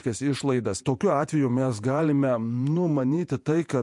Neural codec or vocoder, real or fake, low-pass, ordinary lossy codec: vocoder, 48 kHz, 128 mel bands, Vocos; fake; 10.8 kHz; MP3, 64 kbps